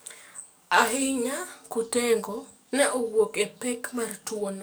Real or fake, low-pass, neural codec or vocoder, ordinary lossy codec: fake; none; codec, 44.1 kHz, 7.8 kbps, DAC; none